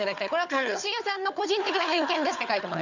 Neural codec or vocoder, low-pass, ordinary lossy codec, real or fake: codec, 16 kHz, 4 kbps, FunCodec, trained on Chinese and English, 50 frames a second; 7.2 kHz; none; fake